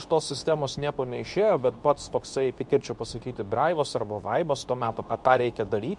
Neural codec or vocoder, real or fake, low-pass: codec, 24 kHz, 0.9 kbps, WavTokenizer, medium speech release version 2; fake; 10.8 kHz